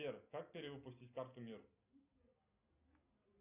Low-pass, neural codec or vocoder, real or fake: 3.6 kHz; none; real